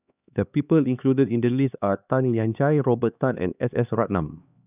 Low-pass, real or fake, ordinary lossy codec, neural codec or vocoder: 3.6 kHz; fake; none; codec, 16 kHz, 2 kbps, X-Codec, HuBERT features, trained on LibriSpeech